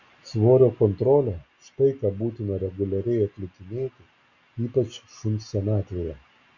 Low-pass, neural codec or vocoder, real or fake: 7.2 kHz; none; real